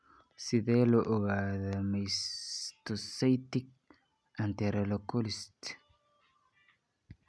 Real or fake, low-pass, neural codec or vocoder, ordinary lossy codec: real; none; none; none